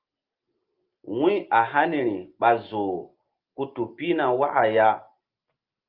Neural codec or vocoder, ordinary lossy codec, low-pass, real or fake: none; Opus, 32 kbps; 5.4 kHz; real